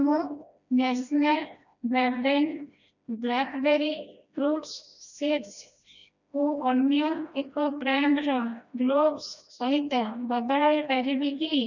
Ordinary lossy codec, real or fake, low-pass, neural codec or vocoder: none; fake; 7.2 kHz; codec, 16 kHz, 1 kbps, FreqCodec, smaller model